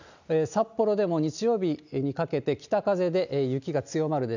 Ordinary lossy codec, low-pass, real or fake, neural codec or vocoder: none; 7.2 kHz; real; none